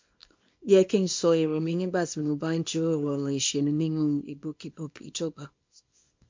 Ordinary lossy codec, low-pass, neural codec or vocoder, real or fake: MP3, 48 kbps; 7.2 kHz; codec, 24 kHz, 0.9 kbps, WavTokenizer, small release; fake